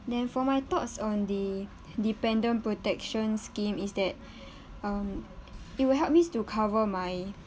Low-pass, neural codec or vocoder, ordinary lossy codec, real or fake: none; none; none; real